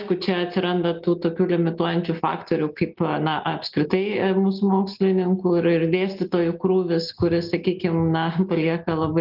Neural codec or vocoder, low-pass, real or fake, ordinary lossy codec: none; 5.4 kHz; real; Opus, 24 kbps